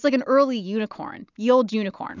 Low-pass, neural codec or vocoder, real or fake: 7.2 kHz; none; real